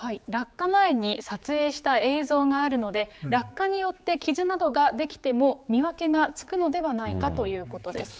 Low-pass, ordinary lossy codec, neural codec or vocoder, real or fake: none; none; codec, 16 kHz, 4 kbps, X-Codec, HuBERT features, trained on general audio; fake